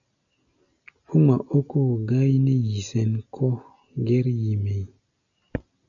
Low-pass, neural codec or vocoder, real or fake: 7.2 kHz; none; real